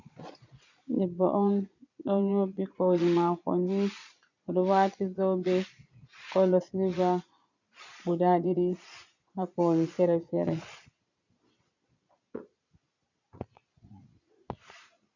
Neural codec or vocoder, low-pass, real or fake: vocoder, 22.05 kHz, 80 mel bands, Vocos; 7.2 kHz; fake